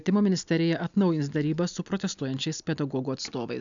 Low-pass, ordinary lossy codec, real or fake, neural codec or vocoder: 7.2 kHz; MP3, 96 kbps; real; none